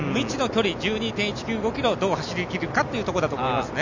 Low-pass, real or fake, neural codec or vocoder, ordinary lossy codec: 7.2 kHz; real; none; none